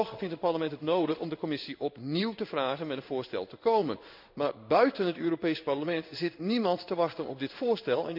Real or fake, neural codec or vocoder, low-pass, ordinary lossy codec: fake; codec, 16 kHz in and 24 kHz out, 1 kbps, XY-Tokenizer; 5.4 kHz; none